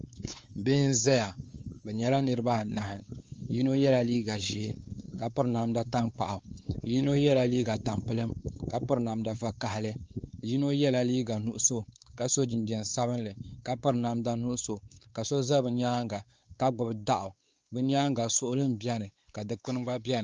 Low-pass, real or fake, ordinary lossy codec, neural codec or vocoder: 7.2 kHz; fake; Opus, 32 kbps; codec, 16 kHz, 4 kbps, X-Codec, WavLM features, trained on Multilingual LibriSpeech